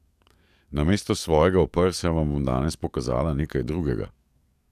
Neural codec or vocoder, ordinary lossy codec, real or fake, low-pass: codec, 44.1 kHz, 7.8 kbps, DAC; none; fake; 14.4 kHz